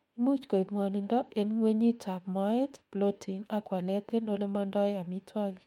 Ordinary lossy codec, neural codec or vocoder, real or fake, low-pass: MP3, 64 kbps; autoencoder, 48 kHz, 32 numbers a frame, DAC-VAE, trained on Japanese speech; fake; 19.8 kHz